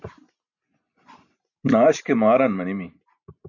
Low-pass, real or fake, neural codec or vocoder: 7.2 kHz; real; none